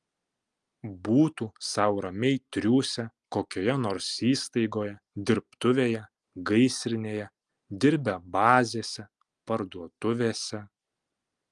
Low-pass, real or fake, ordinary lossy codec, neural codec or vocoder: 10.8 kHz; real; Opus, 32 kbps; none